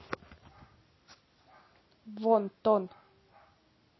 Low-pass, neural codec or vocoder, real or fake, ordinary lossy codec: 7.2 kHz; none; real; MP3, 24 kbps